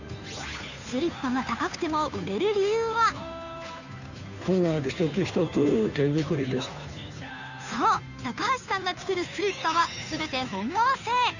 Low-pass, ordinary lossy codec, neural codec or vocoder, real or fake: 7.2 kHz; none; codec, 16 kHz, 2 kbps, FunCodec, trained on Chinese and English, 25 frames a second; fake